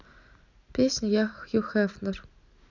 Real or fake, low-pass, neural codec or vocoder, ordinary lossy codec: real; 7.2 kHz; none; none